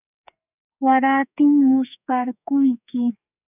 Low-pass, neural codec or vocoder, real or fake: 3.6 kHz; codec, 44.1 kHz, 2.6 kbps, SNAC; fake